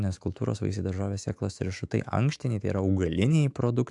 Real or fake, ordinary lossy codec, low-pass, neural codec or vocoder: fake; AAC, 64 kbps; 10.8 kHz; autoencoder, 48 kHz, 128 numbers a frame, DAC-VAE, trained on Japanese speech